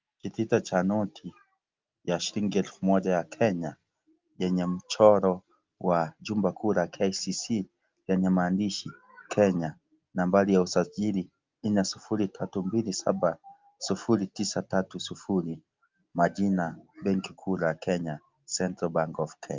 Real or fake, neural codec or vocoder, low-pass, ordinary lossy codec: real; none; 7.2 kHz; Opus, 24 kbps